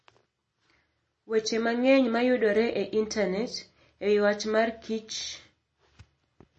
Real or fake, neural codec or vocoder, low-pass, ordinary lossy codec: real; none; 10.8 kHz; MP3, 32 kbps